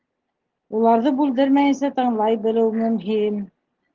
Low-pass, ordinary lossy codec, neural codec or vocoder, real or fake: 7.2 kHz; Opus, 16 kbps; none; real